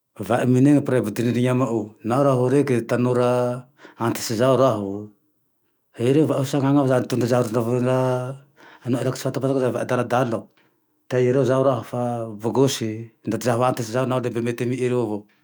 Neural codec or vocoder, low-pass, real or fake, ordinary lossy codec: autoencoder, 48 kHz, 128 numbers a frame, DAC-VAE, trained on Japanese speech; none; fake; none